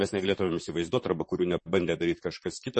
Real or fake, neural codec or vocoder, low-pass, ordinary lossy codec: fake; vocoder, 44.1 kHz, 128 mel bands, Pupu-Vocoder; 10.8 kHz; MP3, 32 kbps